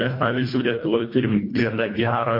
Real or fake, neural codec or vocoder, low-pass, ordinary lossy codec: fake; codec, 24 kHz, 1.5 kbps, HILCodec; 5.4 kHz; MP3, 32 kbps